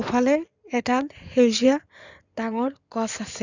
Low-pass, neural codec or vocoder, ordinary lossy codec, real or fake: 7.2 kHz; codec, 16 kHz in and 24 kHz out, 2.2 kbps, FireRedTTS-2 codec; none; fake